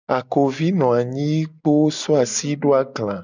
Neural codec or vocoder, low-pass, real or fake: none; 7.2 kHz; real